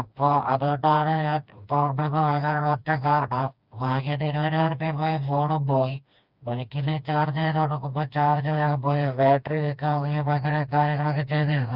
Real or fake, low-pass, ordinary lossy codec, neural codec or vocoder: fake; 5.4 kHz; Opus, 64 kbps; codec, 16 kHz, 2 kbps, FreqCodec, smaller model